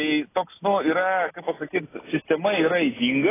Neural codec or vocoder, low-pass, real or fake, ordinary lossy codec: none; 3.6 kHz; real; AAC, 16 kbps